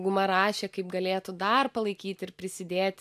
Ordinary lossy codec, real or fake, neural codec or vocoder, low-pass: AAC, 96 kbps; real; none; 14.4 kHz